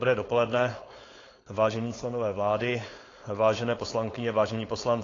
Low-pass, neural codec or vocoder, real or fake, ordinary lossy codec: 7.2 kHz; codec, 16 kHz, 4.8 kbps, FACodec; fake; AAC, 32 kbps